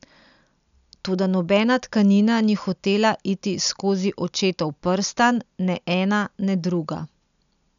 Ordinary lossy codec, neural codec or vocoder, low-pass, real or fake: none; none; 7.2 kHz; real